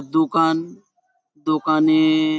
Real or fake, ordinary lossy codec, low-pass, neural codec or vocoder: real; none; none; none